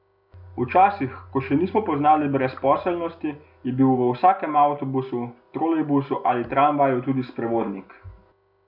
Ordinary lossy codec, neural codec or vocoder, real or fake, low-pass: none; none; real; 5.4 kHz